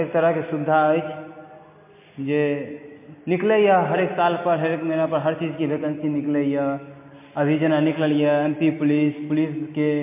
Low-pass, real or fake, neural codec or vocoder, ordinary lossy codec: 3.6 kHz; real; none; MP3, 24 kbps